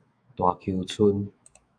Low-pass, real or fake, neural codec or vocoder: 9.9 kHz; fake; autoencoder, 48 kHz, 128 numbers a frame, DAC-VAE, trained on Japanese speech